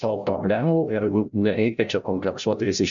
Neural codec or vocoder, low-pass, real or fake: codec, 16 kHz, 0.5 kbps, FreqCodec, larger model; 7.2 kHz; fake